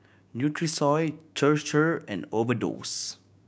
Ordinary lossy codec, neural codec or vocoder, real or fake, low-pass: none; codec, 16 kHz, 6 kbps, DAC; fake; none